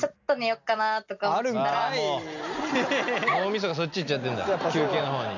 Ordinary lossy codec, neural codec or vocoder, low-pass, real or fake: none; none; 7.2 kHz; real